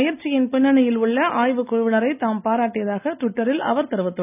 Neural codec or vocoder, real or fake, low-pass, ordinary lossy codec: none; real; 3.6 kHz; none